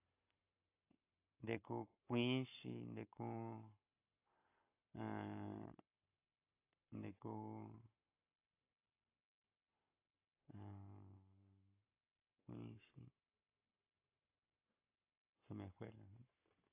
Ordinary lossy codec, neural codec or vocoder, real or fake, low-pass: AAC, 32 kbps; codec, 44.1 kHz, 7.8 kbps, Pupu-Codec; fake; 3.6 kHz